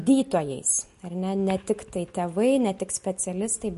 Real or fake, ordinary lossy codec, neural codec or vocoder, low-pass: real; MP3, 48 kbps; none; 14.4 kHz